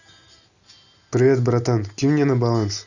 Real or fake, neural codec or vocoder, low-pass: real; none; 7.2 kHz